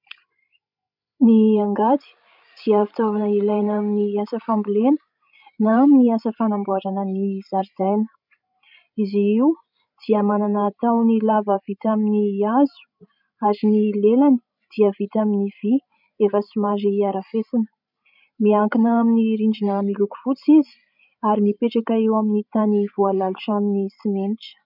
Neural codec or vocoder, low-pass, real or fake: codec, 16 kHz, 16 kbps, FreqCodec, larger model; 5.4 kHz; fake